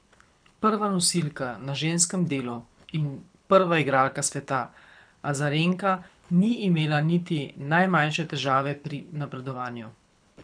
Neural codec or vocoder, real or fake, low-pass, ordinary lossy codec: codec, 24 kHz, 6 kbps, HILCodec; fake; 9.9 kHz; none